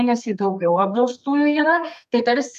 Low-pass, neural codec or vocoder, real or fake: 14.4 kHz; codec, 32 kHz, 1.9 kbps, SNAC; fake